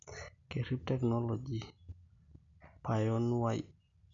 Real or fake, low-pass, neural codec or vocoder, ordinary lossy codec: real; 7.2 kHz; none; none